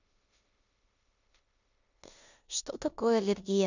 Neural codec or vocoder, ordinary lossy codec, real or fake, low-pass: codec, 16 kHz in and 24 kHz out, 0.9 kbps, LongCat-Audio-Codec, four codebook decoder; none; fake; 7.2 kHz